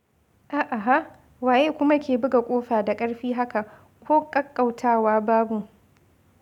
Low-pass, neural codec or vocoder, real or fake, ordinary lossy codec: 19.8 kHz; none; real; none